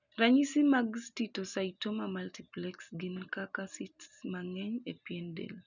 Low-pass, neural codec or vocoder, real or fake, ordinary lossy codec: 7.2 kHz; none; real; none